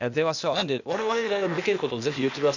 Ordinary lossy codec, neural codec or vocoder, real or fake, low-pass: none; codec, 16 kHz, 0.8 kbps, ZipCodec; fake; 7.2 kHz